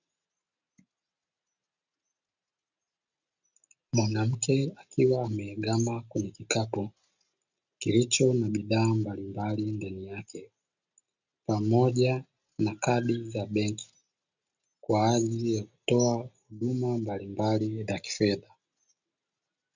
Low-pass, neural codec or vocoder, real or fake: 7.2 kHz; none; real